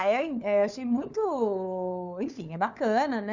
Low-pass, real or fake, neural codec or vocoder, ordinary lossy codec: 7.2 kHz; fake; codec, 16 kHz, 4 kbps, FunCodec, trained on LibriTTS, 50 frames a second; none